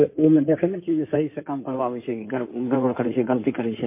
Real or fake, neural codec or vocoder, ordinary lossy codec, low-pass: fake; codec, 16 kHz in and 24 kHz out, 2.2 kbps, FireRedTTS-2 codec; MP3, 24 kbps; 3.6 kHz